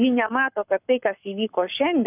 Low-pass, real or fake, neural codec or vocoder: 3.6 kHz; fake; codec, 44.1 kHz, 7.8 kbps, Pupu-Codec